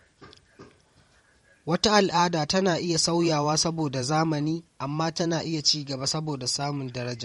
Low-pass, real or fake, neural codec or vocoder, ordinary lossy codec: 19.8 kHz; fake; vocoder, 44.1 kHz, 128 mel bands every 512 samples, BigVGAN v2; MP3, 48 kbps